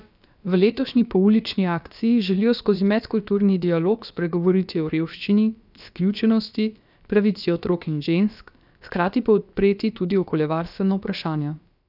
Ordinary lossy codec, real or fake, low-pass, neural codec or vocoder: none; fake; 5.4 kHz; codec, 16 kHz, about 1 kbps, DyCAST, with the encoder's durations